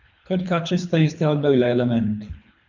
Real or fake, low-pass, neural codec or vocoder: fake; 7.2 kHz; codec, 24 kHz, 3 kbps, HILCodec